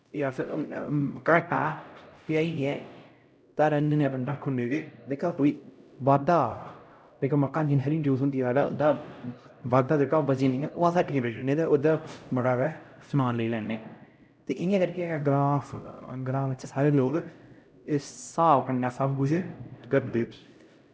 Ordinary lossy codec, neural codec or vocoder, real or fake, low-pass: none; codec, 16 kHz, 0.5 kbps, X-Codec, HuBERT features, trained on LibriSpeech; fake; none